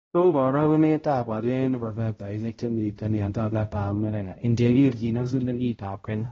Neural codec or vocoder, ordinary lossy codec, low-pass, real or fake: codec, 16 kHz, 0.5 kbps, X-Codec, HuBERT features, trained on balanced general audio; AAC, 24 kbps; 7.2 kHz; fake